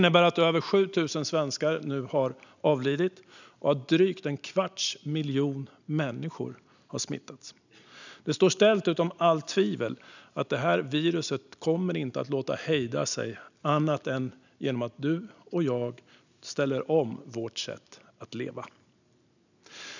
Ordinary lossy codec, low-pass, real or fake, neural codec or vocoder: none; 7.2 kHz; real; none